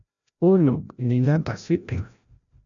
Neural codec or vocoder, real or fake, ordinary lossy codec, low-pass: codec, 16 kHz, 0.5 kbps, FreqCodec, larger model; fake; MP3, 96 kbps; 7.2 kHz